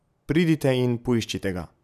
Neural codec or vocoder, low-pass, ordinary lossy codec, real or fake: none; 14.4 kHz; none; real